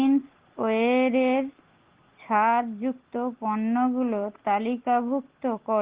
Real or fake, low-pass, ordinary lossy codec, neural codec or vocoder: real; 3.6 kHz; Opus, 16 kbps; none